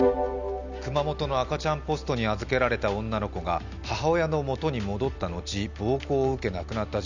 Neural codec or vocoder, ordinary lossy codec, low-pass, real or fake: none; none; 7.2 kHz; real